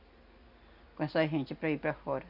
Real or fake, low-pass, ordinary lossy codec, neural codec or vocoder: real; 5.4 kHz; none; none